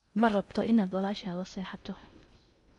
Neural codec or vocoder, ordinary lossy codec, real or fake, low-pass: codec, 16 kHz in and 24 kHz out, 0.6 kbps, FocalCodec, streaming, 4096 codes; none; fake; 10.8 kHz